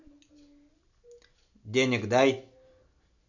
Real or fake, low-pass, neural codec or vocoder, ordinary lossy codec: real; 7.2 kHz; none; none